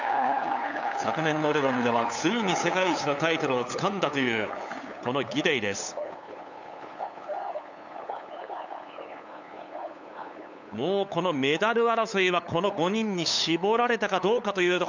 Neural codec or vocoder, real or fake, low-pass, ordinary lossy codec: codec, 16 kHz, 8 kbps, FunCodec, trained on LibriTTS, 25 frames a second; fake; 7.2 kHz; none